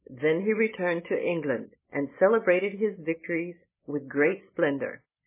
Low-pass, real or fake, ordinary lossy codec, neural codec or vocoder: 3.6 kHz; real; MP3, 16 kbps; none